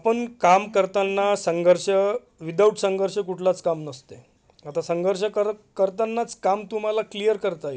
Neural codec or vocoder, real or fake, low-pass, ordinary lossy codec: none; real; none; none